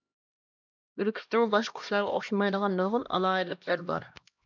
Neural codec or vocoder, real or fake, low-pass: codec, 16 kHz, 1 kbps, X-Codec, HuBERT features, trained on LibriSpeech; fake; 7.2 kHz